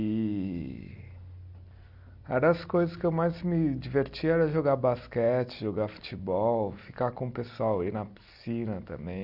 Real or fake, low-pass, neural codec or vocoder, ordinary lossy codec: real; 5.4 kHz; none; Opus, 64 kbps